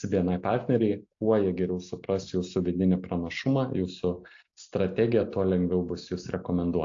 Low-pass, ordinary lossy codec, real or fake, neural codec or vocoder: 7.2 kHz; AAC, 48 kbps; real; none